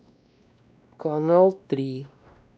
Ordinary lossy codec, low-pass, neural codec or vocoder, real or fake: none; none; codec, 16 kHz, 1 kbps, X-Codec, WavLM features, trained on Multilingual LibriSpeech; fake